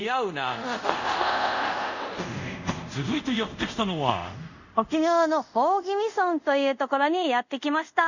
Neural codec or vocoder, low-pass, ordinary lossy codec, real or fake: codec, 24 kHz, 0.5 kbps, DualCodec; 7.2 kHz; none; fake